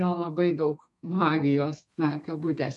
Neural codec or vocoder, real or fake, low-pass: codec, 32 kHz, 1.9 kbps, SNAC; fake; 10.8 kHz